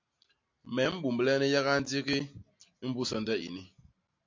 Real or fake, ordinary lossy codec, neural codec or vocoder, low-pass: real; MP3, 48 kbps; none; 7.2 kHz